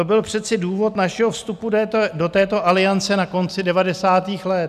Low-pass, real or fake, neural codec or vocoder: 14.4 kHz; real; none